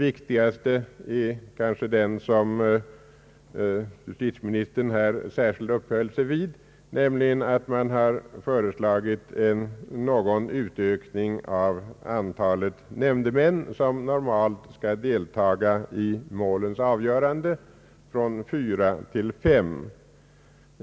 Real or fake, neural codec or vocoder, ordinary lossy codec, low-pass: real; none; none; none